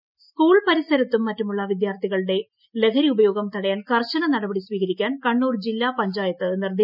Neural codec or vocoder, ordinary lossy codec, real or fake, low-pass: none; none; real; 5.4 kHz